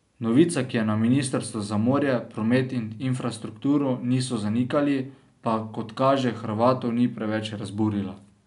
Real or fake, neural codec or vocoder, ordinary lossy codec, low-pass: real; none; none; 10.8 kHz